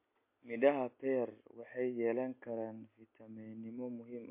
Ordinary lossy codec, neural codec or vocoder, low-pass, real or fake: MP3, 24 kbps; none; 3.6 kHz; real